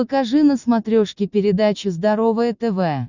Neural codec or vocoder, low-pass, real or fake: none; 7.2 kHz; real